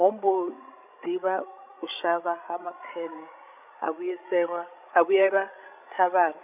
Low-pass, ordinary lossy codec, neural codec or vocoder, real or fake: 3.6 kHz; none; codec, 16 kHz, 16 kbps, FreqCodec, larger model; fake